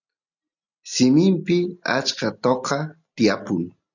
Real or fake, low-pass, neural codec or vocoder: real; 7.2 kHz; none